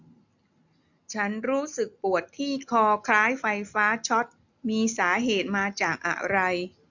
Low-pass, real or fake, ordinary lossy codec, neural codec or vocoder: 7.2 kHz; real; none; none